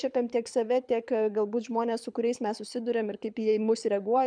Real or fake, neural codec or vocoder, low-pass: fake; codec, 24 kHz, 6 kbps, HILCodec; 9.9 kHz